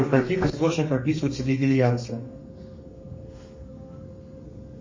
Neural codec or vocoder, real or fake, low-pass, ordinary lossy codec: codec, 32 kHz, 1.9 kbps, SNAC; fake; 7.2 kHz; MP3, 32 kbps